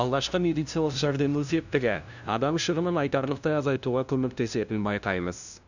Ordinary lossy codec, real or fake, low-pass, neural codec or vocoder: none; fake; 7.2 kHz; codec, 16 kHz, 0.5 kbps, FunCodec, trained on LibriTTS, 25 frames a second